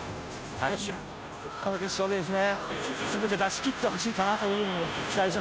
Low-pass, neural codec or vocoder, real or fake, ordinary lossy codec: none; codec, 16 kHz, 0.5 kbps, FunCodec, trained on Chinese and English, 25 frames a second; fake; none